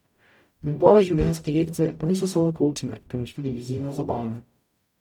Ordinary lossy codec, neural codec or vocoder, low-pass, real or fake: none; codec, 44.1 kHz, 0.9 kbps, DAC; 19.8 kHz; fake